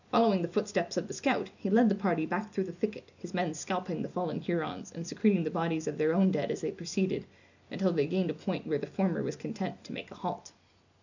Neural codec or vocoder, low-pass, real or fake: none; 7.2 kHz; real